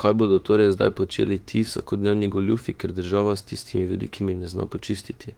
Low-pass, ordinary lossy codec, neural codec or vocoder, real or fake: 19.8 kHz; Opus, 24 kbps; autoencoder, 48 kHz, 32 numbers a frame, DAC-VAE, trained on Japanese speech; fake